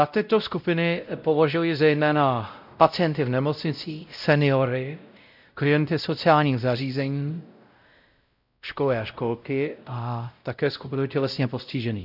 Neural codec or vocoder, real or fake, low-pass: codec, 16 kHz, 0.5 kbps, X-Codec, WavLM features, trained on Multilingual LibriSpeech; fake; 5.4 kHz